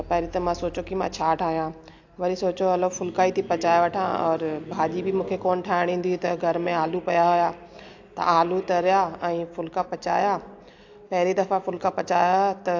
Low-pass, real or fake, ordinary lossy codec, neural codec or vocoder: 7.2 kHz; real; AAC, 48 kbps; none